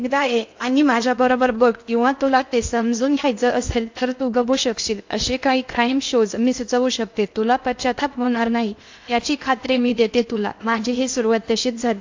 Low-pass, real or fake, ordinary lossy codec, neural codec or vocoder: 7.2 kHz; fake; AAC, 48 kbps; codec, 16 kHz in and 24 kHz out, 0.6 kbps, FocalCodec, streaming, 2048 codes